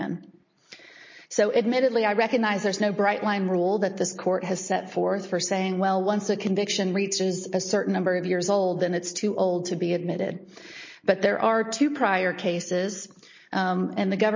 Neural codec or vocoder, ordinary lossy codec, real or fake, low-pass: none; MP3, 32 kbps; real; 7.2 kHz